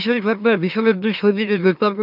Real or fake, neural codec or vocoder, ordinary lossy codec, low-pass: fake; autoencoder, 44.1 kHz, a latent of 192 numbers a frame, MeloTTS; none; 5.4 kHz